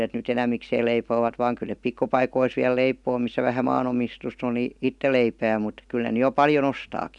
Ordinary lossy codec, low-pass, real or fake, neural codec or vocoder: none; 10.8 kHz; real; none